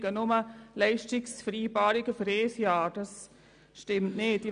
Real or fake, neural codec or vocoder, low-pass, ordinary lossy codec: real; none; 9.9 kHz; none